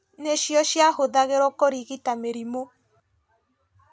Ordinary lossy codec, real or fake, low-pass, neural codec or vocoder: none; real; none; none